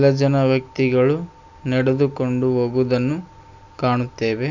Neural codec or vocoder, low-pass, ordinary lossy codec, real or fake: none; 7.2 kHz; none; real